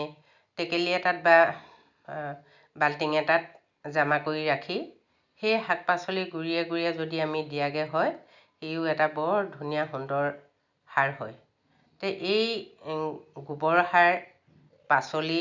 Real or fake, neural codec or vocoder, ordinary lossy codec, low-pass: real; none; none; 7.2 kHz